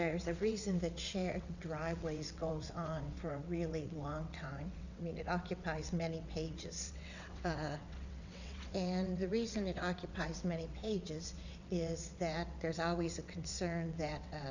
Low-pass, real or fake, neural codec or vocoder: 7.2 kHz; fake; vocoder, 22.05 kHz, 80 mel bands, WaveNeXt